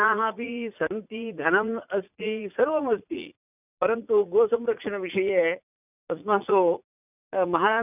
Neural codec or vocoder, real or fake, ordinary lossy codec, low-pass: vocoder, 44.1 kHz, 80 mel bands, Vocos; fake; none; 3.6 kHz